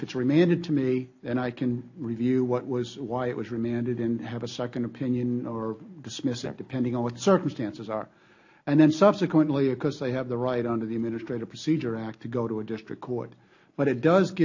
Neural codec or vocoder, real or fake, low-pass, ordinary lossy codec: none; real; 7.2 kHz; AAC, 48 kbps